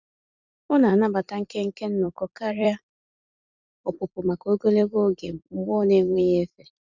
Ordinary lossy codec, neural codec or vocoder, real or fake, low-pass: Opus, 64 kbps; vocoder, 44.1 kHz, 128 mel bands every 256 samples, BigVGAN v2; fake; 7.2 kHz